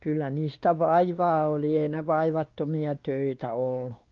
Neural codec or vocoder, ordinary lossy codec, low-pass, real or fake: codec, 16 kHz, 2 kbps, X-Codec, WavLM features, trained on Multilingual LibriSpeech; Opus, 32 kbps; 7.2 kHz; fake